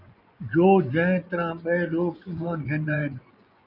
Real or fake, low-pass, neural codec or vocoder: fake; 5.4 kHz; vocoder, 24 kHz, 100 mel bands, Vocos